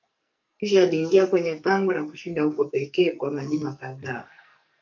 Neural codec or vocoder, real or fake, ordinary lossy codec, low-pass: codec, 44.1 kHz, 2.6 kbps, SNAC; fake; MP3, 64 kbps; 7.2 kHz